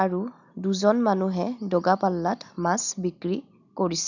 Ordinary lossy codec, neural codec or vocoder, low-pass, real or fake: none; none; 7.2 kHz; real